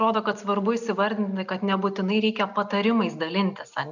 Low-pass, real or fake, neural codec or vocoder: 7.2 kHz; real; none